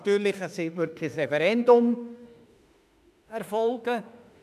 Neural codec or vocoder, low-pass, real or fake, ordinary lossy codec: autoencoder, 48 kHz, 32 numbers a frame, DAC-VAE, trained on Japanese speech; 14.4 kHz; fake; none